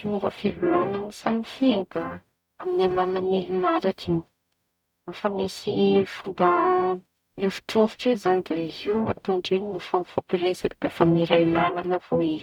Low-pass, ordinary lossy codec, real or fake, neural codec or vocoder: 19.8 kHz; none; fake; codec, 44.1 kHz, 0.9 kbps, DAC